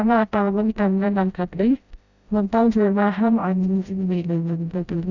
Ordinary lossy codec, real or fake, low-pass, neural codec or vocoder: none; fake; 7.2 kHz; codec, 16 kHz, 0.5 kbps, FreqCodec, smaller model